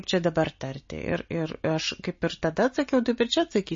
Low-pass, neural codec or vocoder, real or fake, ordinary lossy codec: 7.2 kHz; none; real; MP3, 32 kbps